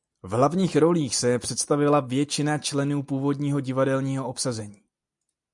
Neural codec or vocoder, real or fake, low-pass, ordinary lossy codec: none; real; 10.8 kHz; MP3, 96 kbps